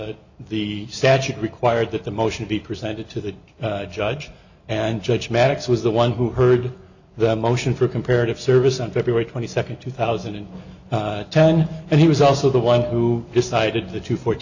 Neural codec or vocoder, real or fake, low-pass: none; real; 7.2 kHz